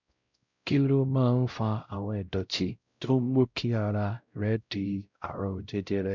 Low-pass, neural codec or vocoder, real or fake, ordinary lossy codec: 7.2 kHz; codec, 16 kHz, 0.5 kbps, X-Codec, WavLM features, trained on Multilingual LibriSpeech; fake; none